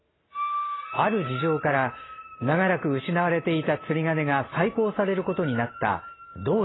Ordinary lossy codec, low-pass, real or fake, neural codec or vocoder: AAC, 16 kbps; 7.2 kHz; real; none